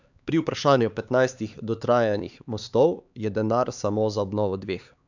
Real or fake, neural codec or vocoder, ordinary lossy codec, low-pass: fake; codec, 16 kHz, 4 kbps, X-Codec, HuBERT features, trained on LibriSpeech; none; 7.2 kHz